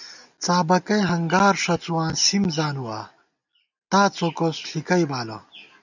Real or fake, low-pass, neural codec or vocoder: real; 7.2 kHz; none